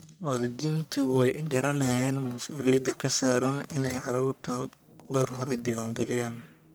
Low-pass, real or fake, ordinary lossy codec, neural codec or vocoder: none; fake; none; codec, 44.1 kHz, 1.7 kbps, Pupu-Codec